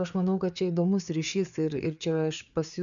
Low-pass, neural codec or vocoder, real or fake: 7.2 kHz; codec, 16 kHz, 4 kbps, FreqCodec, larger model; fake